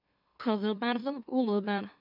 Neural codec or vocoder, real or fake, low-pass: autoencoder, 44.1 kHz, a latent of 192 numbers a frame, MeloTTS; fake; 5.4 kHz